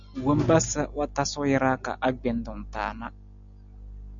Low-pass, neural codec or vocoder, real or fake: 7.2 kHz; none; real